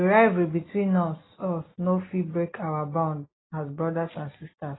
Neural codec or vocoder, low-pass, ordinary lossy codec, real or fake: none; 7.2 kHz; AAC, 16 kbps; real